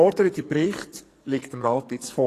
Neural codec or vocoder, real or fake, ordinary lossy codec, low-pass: codec, 44.1 kHz, 2.6 kbps, SNAC; fake; AAC, 48 kbps; 14.4 kHz